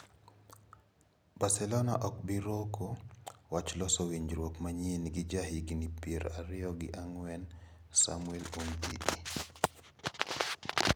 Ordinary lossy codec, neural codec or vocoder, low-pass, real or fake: none; none; none; real